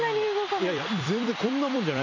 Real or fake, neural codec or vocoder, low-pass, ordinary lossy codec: fake; vocoder, 44.1 kHz, 128 mel bands every 256 samples, BigVGAN v2; 7.2 kHz; none